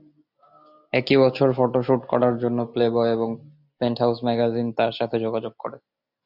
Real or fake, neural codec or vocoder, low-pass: real; none; 5.4 kHz